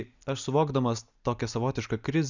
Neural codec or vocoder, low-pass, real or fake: none; 7.2 kHz; real